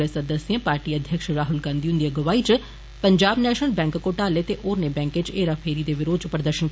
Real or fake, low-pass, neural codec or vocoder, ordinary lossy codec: real; none; none; none